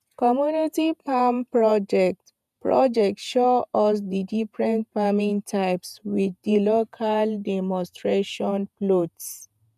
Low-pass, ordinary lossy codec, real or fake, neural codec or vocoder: 14.4 kHz; none; fake; vocoder, 48 kHz, 128 mel bands, Vocos